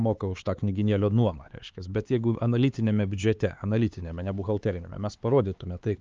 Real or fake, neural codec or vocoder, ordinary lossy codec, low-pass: fake; codec, 16 kHz, 4 kbps, X-Codec, HuBERT features, trained on LibriSpeech; Opus, 24 kbps; 7.2 kHz